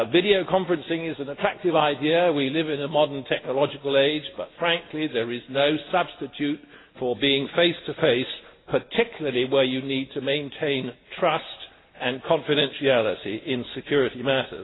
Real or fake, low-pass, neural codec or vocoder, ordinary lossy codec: real; 7.2 kHz; none; AAC, 16 kbps